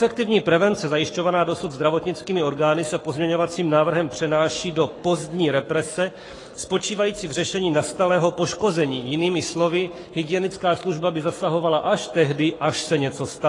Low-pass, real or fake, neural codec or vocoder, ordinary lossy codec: 10.8 kHz; fake; codec, 44.1 kHz, 7.8 kbps, Pupu-Codec; AAC, 32 kbps